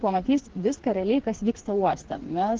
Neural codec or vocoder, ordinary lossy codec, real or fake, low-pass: codec, 16 kHz, 4 kbps, FreqCodec, smaller model; Opus, 16 kbps; fake; 7.2 kHz